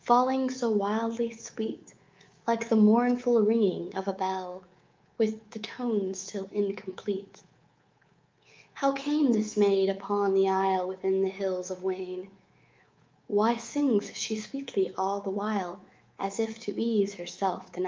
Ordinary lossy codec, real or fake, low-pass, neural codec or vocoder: Opus, 24 kbps; fake; 7.2 kHz; codec, 24 kHz, 3.1 kbps, DualCodec